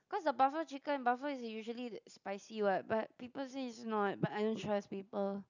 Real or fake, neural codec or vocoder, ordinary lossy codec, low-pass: real; none; none; 7.2 kHz